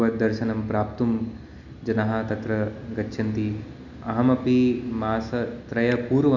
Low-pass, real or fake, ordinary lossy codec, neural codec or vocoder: 7.2 kHz; real; none; none